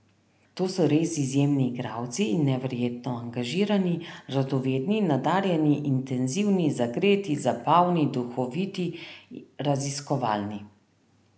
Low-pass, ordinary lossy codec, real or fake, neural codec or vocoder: none; none; real; none